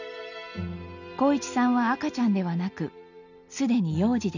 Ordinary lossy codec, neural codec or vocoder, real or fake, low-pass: none; none; real; 7.2 kHz